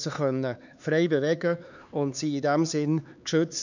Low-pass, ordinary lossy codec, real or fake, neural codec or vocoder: 7.2 kHz; none; fake; codec, 16 kHz, 4 kbps, X-Codec, HuBERT features, trained on LibriSpeech